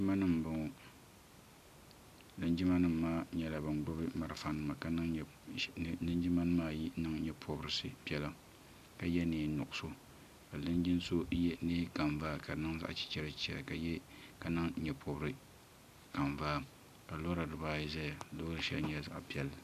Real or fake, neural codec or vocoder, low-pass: real; none; 14.4 kHz